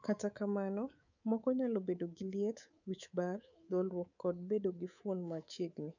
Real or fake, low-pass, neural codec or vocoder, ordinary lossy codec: fake; 7.2 kHz; codec, 24 kHz, 3.1 kbps, DualCodec; none